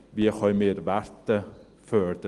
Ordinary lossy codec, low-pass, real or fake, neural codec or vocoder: Opus, 24 kbps; 10.8 kHz; real; none